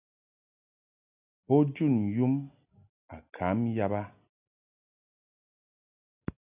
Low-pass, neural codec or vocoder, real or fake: 3.6 kHz; none; real